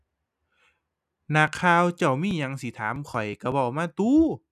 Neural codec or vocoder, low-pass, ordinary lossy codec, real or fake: none; 14.4 kHz; none; real